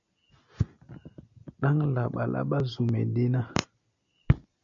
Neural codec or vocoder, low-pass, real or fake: none; 7.2 kHz; real